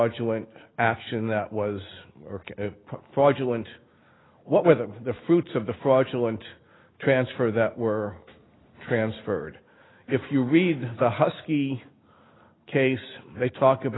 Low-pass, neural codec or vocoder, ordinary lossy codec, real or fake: 7.2 kHz; none; AAC, 16 kbps; real